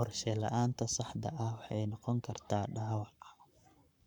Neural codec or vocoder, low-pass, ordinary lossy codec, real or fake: codec, 44.1 kHz, 7.8 kbps, Pupu-Codec; 19.8 kHz; none; fake